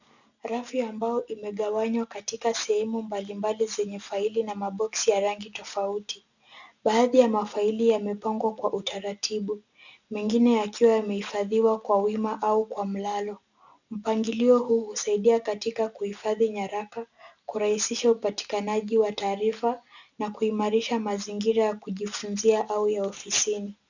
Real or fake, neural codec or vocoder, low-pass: real; none; 7.2 kHz